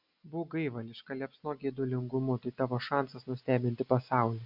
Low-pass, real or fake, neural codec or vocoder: 5.4 kHz; real; none